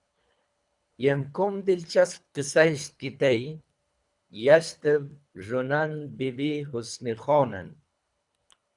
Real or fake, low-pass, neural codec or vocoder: fake; 10.8 kHz; codec, 24 kHz, 3 kbps, HILCodec